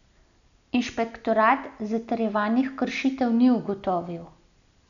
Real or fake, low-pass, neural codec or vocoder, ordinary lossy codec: real; 7.2 kHz; none; MP3, 96 kbps